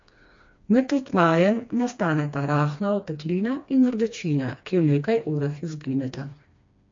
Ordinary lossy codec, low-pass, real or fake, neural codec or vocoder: MP3, 48 kbps; 7.2 kHz; fake; codec, 16 kHz, 2 kbps, FreqCodec, smaller model